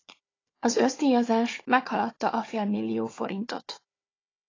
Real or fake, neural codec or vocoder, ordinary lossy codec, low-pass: fake; codec, 16 kHz, 4 kbps, FunCodec, trained on Chinese and English, 50 frames a second; AAC, 32 kbps; 7.2 kHz